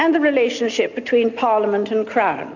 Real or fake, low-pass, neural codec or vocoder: real; 7.2 kHz; none